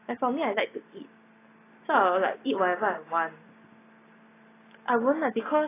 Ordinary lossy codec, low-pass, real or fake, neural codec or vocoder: AAC, 16 kbps; 3.6 kHz; real; none